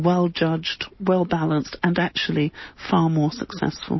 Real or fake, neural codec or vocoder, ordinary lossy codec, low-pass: real; none; MP3, 24 kbps; 7.2 kHz